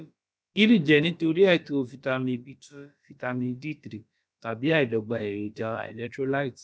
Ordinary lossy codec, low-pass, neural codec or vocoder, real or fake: none; none; codec, 16 kHz, about 1 kbps, DyCAST, with the encoder's durations; fake